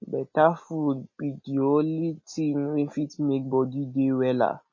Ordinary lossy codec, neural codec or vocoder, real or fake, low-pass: MP3, 32 kbps; none; real; 7.2 kHz